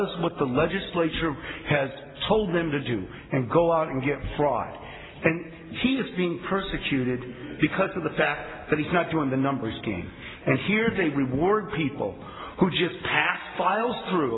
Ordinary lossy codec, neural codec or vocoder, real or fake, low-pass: AAC, 16 kbps; none; real; 7.2 kHz